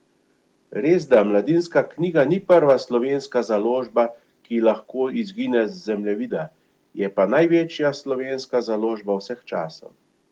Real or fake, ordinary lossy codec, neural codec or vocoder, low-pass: real; Opus, 24 kbps; none; 19.8 kHz